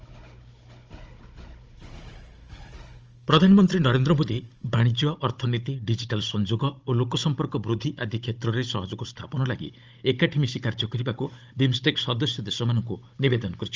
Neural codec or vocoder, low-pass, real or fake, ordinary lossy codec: codec, 16 kHz, 16 kbps, FunCodec, trained on Chinese and English, 50 frames a second; 7.2 kHz; fake; Opus, 24 kbps